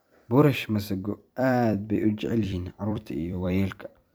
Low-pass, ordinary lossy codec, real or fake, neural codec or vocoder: none; none; fake; vocoder, 44.1 kHz, 128 mel bands every 256 samples, BigVGAN v2